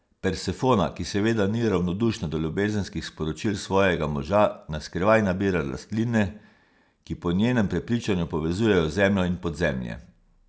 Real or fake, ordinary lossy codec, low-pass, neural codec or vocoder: real; none; none; none